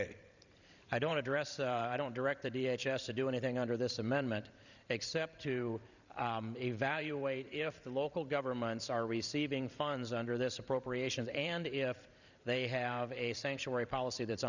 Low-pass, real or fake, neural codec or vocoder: 7.2 kHz; fake; vocoder, 44.1 kHz, 128 mel bands every 512 samples, BigVGAN v2